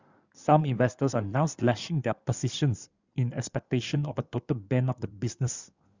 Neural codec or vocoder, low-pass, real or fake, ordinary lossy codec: codec, 16 kHz in and 24 kHz out, 2.2 kbps, FireRedTTS-2 codec; 7.2 kHz; fake; Opus, 64 kbps